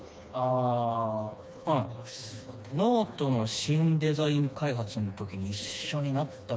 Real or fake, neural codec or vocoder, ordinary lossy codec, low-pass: fake; codec, 16 kHz, 2 kbps, FreqCodec, smaller model; none; none